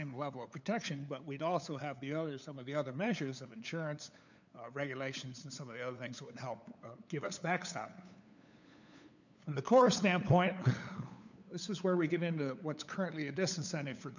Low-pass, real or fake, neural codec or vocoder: 7.2 kHz; fake; codec, 16 kHz, 8 kbps, FunCodec, trained on LibriTTS, 25 frames a second